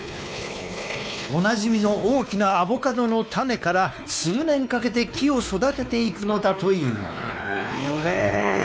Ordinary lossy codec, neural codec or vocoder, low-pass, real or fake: none; codec, 16 kHz, 2 kbps, X-Codec, WavLM features, trained on Multilingual LibriSpeech; none; fake